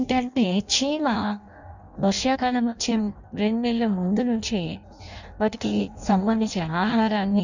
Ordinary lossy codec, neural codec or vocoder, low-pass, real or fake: none; codec, 16 kHz in and 24 kHz out, 0.6 kbps, FireRedTTS-2 codec; 7.2 kHz; fake